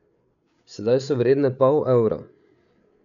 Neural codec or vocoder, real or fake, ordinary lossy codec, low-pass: codec, 16 kHz, 8 kbps, FreqCodec, larger model; fake; none; 7.2 kHz